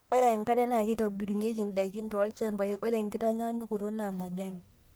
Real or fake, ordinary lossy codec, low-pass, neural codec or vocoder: fake; none; none; codec, 44.1 kHz, 1.7 kbps, Pupu-Codec